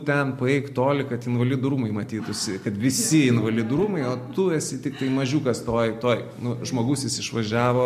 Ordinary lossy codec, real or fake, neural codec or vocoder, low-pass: MP3, 64 kbps; real; none; 14.4 kHz